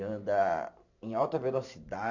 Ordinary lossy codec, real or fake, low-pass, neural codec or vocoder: none; fake; 7.2 kHz; vocoder, 44.1 kHz, 128 mel bands every 512 samples, BigVGAN v2